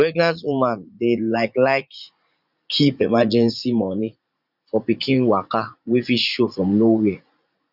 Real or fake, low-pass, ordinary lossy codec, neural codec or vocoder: fake; 5.4 kHz; Opus, 64 kbps; vocoder, 44.1 kHz, 80 mel bands, Vocos